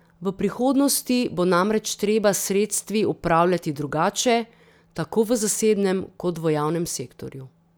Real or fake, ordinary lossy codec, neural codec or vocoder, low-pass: real; none; none; none